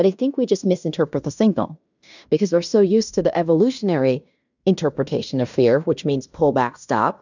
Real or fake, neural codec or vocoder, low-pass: fake; codec, 16 kHz in and 24 kHz out, 0.9 kbps, LongCat-Audio-Codec, fine tuned four codebook decoder; 7.2 kHz